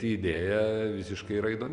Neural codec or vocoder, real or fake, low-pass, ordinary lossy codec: none; real; 10.8 kHz; Opus, 64 kbps